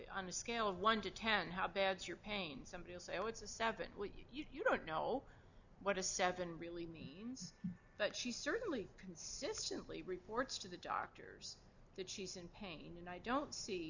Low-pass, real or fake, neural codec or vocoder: 7.2 kHz; real; none